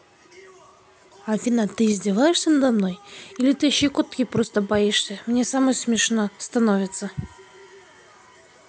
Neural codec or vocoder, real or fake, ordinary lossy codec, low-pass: none; real; none; none